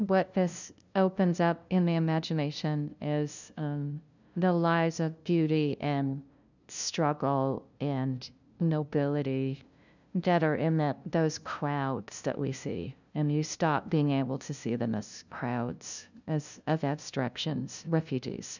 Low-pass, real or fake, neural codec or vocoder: 7.2 kHz; fake; codec, 16 kHz, 0.5 kbps, FunCodec, trained on LibriTTS, 25 frames a second